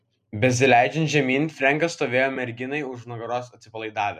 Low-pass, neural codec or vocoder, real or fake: 14.4 kHz; none; real